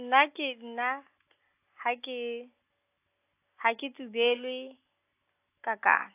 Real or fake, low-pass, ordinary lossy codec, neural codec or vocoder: real; 3.6 kHz; AAC, 24 kbps; none